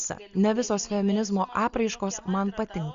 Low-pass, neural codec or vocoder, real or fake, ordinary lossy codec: 7.2 kHz; none; real; Opus, 64 kbps